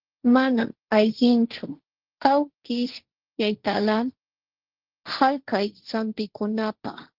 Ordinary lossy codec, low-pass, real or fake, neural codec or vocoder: Opus, 16 kbps; 5.4 kHz; fake; codec, 16 kHz, 1.1 kbps, Voila-Tokenizer